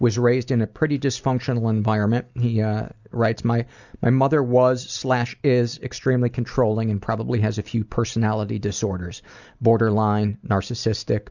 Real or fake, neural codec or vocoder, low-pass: real; none; 7.2 kHz